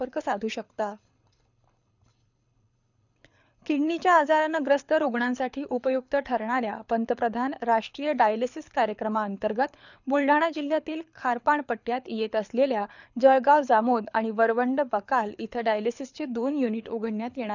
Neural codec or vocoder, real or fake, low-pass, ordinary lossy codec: codec, 24 kHz, 6 kbps, HILCodec; fake; 7.2 kHz; none